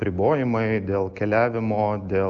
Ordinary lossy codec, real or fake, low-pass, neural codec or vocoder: Opus, 24 kbps; real; 7.2 kHz; none